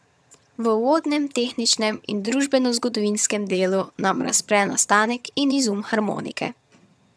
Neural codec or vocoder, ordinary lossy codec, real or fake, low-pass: vocoder, 22.05 kHz, 80 mel bands, HiFi-GAN; none; fake; none